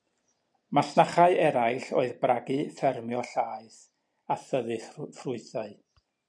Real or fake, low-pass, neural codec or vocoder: real; 9.9 kHz; none